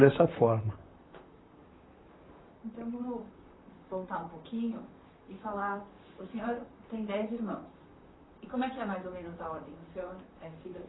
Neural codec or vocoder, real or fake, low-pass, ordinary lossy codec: vocoder, 44.1 kHz, 128 mel bands, Pupu-Vocoder; fake; 7.2 kHz; AAC, 16 kbps